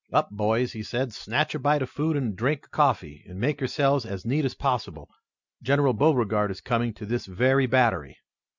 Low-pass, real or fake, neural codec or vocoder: 7.2 kHz; real; none